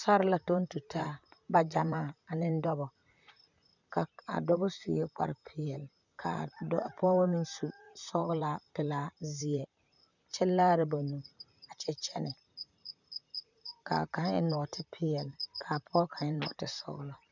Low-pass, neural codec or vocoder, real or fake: 7.2 kHz; vocoder, 44.1 kHz, 128 mel bands, Pupu-Vocoder; fake